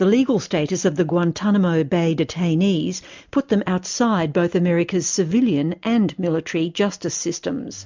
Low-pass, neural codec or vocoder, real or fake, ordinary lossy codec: 7.2 kHz; none; real; MP3, 64 kbps